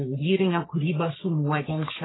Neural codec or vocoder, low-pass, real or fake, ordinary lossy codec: codec, 44.1 kHz, 3.4 kbps, Pupu-Codec; 7.2 kHz; fake; AAC, 16 kbps